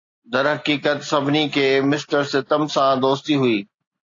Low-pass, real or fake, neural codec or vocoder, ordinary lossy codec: 7.2 kHz; real; none; AAC, 48 kbps